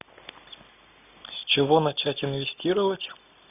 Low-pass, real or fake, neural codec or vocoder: 3.6 kHz; real; none